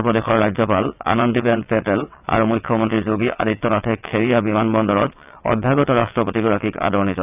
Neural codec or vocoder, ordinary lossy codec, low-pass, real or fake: vocoder, 22.05 kHz, 80 mel bands, WaveNeXt; none; 3.6 kHz; fake